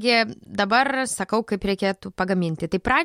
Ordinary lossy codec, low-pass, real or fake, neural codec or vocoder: MP3, 64 kbps; 19.8 kHz; real; none